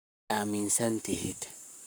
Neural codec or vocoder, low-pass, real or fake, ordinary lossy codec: codec, 44.1 kHz, 7.8 kbps, Pupu-Codec; none; fake; none